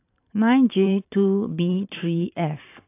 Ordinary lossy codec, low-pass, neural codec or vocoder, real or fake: AAC, 24 kbps; 3.6 kHz; vocoder, 44.1 kHz, 128 mel bands every 512 samples, BigVGAN v2; fake